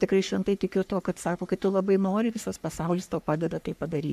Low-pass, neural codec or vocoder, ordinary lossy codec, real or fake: 14.4 kHz; codec, 44.1 kHz, 3.4 kbps, Pupu-Codec; MP3, 96 kbps; fake